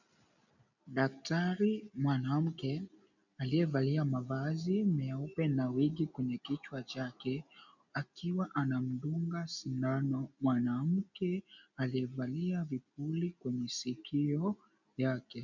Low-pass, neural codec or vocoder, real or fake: 7.2 kHz; none; real